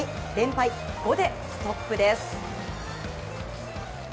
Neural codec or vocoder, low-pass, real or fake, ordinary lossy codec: none; none; real; none